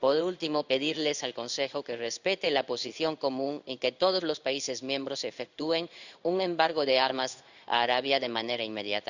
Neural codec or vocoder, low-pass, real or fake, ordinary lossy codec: codec, 16 kHz in and 24 kHz out, 1 kbps, XY-Tokenizer; 7.2 kHz; fake; none